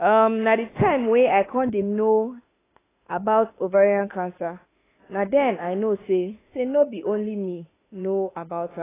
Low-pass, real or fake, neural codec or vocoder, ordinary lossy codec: 3.6 kHz; fake; autoencoder, 48 kHz, 32 numbers a frame, DAC-VAE, trained on Japanese speech; AAC, 16 kbps